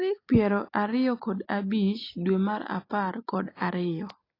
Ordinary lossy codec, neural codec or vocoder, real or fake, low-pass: AAC, 24 kbps; none; real; 5.4 kHz